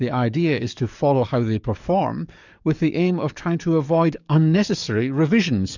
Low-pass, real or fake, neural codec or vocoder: 7.2 kHz; fake; codec, 44.1 kHz, 7.8 kbps, DAC